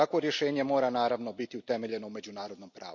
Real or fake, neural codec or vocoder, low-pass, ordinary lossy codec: real; none; 7.2 kHz; none